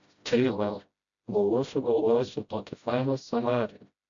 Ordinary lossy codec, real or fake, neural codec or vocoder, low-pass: AAC, 64 kbps; fake; codec, 16 kHz, 0.5 kbps, FreqCodec, smaller model; 7.2 kHz